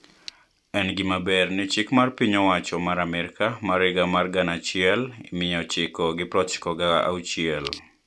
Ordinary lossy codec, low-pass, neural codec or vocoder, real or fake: none; none; none; real